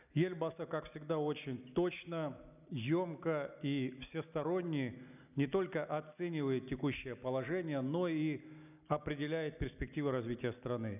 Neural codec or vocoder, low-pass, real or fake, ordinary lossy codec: none; 3.6 kHz; real; none